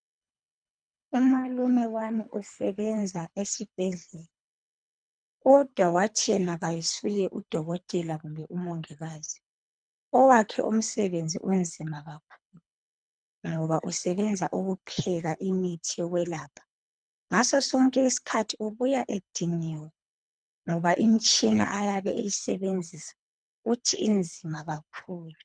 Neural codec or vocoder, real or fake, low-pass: codec, 24 kHz, 3 kbps, HILCodec; fake; 9.9 kHz